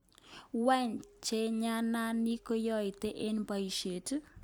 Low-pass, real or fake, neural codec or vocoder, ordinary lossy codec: none; real; none; none